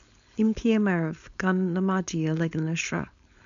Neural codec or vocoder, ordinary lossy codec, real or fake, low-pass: codec, 16 kHz, 4.8 kbps, FACodec; AAC, 96 kbps; fake; 7.2 kHz